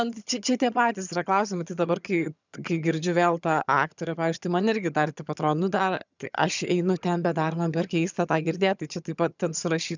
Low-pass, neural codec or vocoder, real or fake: 7.2 kHz; vocoder, 22.05 kHz, 80 mel bands, HiFi-GAN; fake